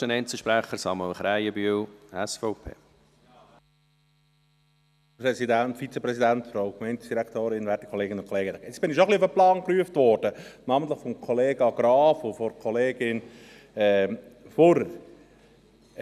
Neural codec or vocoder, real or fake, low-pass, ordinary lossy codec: none; real; 14.4 kHz; none